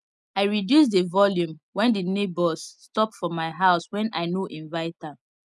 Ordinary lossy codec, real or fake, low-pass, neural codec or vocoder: none; real; none; none